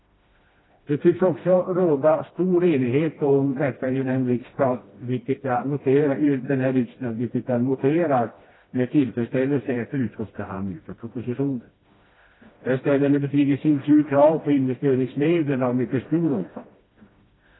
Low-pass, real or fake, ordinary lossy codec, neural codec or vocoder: 7.2 kHz; fake; AAC, 16 kbps; codec, 16 kHz, 1 kbps, FreqCodec, smaller model